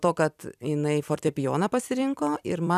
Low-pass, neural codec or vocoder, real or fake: 14.4 kHz; vocoder, 44.1 kHz, 128 mel bands every 256 samples, BigVGAN v2; fake